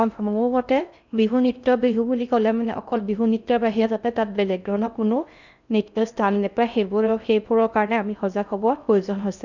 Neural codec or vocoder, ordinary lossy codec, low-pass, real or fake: codec, 16 kHz in and 24 kHz out, 0.6 kbps, FocalCodec, streaming, 4096 codes; none; 7.2 kHz; fake